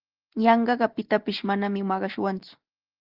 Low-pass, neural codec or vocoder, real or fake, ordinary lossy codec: 5.4 kHz; none; real; Opus, 16 kbps